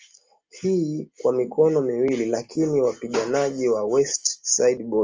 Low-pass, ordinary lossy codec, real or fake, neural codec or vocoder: 7.2 kHz; Opus, 32 kbps; real; none